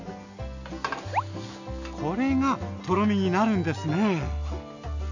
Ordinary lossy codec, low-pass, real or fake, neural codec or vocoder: none; 7.2 kHz; real; none